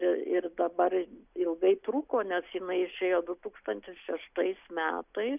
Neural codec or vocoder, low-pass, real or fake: none; 3.6 kHz; real